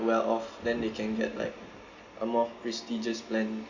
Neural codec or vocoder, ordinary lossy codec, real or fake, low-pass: none; Opus, 64 kbps; real; 7.2 kHz